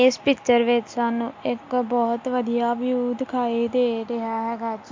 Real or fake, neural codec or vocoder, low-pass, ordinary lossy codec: real; none; 7.2 kHz; MP3, 48 kbps